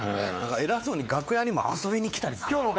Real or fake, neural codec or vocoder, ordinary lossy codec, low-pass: fake; codec, 16 kHz, 4 kbps, X-Codec, WavLM features, trained on Multilingual LibriSpeech; none; none